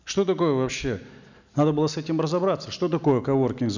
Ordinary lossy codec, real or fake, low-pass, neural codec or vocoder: none; real; 7.2 kHz; none